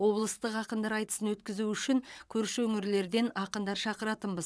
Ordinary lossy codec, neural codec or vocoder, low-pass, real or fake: none; vocoder, 22.05 kHz, 80 mel bands, WaveNeXt; none; fake